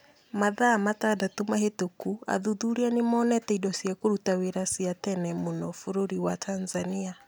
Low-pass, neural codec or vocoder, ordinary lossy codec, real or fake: none; none; none; real